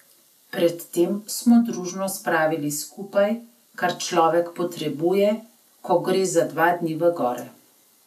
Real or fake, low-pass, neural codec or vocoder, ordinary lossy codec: real; 14.4 kHz; none; none